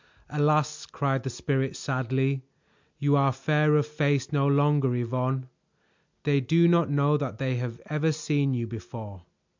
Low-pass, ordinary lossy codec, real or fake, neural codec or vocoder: 7.2 kHz; MP3, 64 kbps; real; none